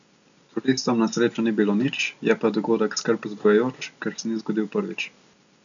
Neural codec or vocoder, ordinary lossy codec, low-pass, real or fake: none; none; 7.2 kHz; real